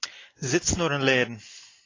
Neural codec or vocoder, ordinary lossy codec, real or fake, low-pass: none; AAC, 32 kbps; real; 7.2 kHz